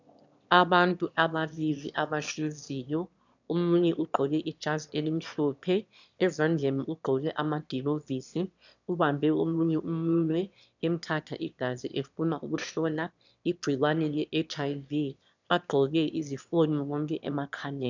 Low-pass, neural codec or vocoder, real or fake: 7.2 kHz; autoencoder, 22.05 kHz, a latent of 192 numbers a frame, VITS, trained on one speaker; fake